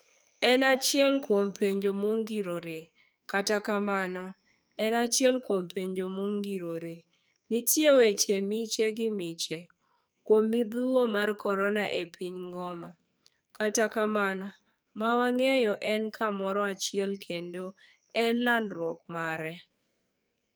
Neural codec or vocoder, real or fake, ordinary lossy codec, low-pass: codec, 44.1 kHz, 2.6 kbps, SNAC; fake; none; none